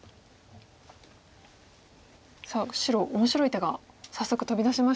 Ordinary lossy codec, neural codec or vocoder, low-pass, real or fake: none; none; none; real